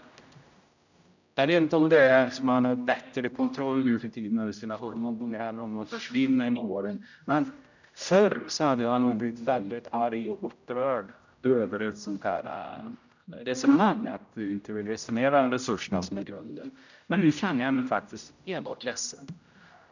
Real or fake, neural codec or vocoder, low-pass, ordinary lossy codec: fake; codec, 16 kHz, 0.5 kbps, X-Codec, HuBERT features, trained on general audio; 7.2 kHz; none